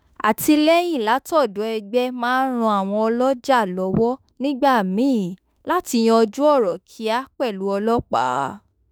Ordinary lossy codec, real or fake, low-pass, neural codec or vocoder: none; fake; none; autoencoder, 48 kHz, 32 numbers a frame, DAC-VAE, trained on Japanese speech